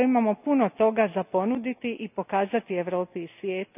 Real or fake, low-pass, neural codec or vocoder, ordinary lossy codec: real; 3.6 kHz; none; none